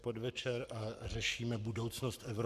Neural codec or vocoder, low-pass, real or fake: codec, 44.1 kHz, 7.8 kbps, Pupu-Codec; 14.4 kHz; fake